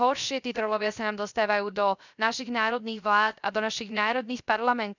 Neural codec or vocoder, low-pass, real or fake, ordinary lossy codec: codec, 16 kHz, 0.3 kbps, FocalCodec; 7.2 kHz; fake; none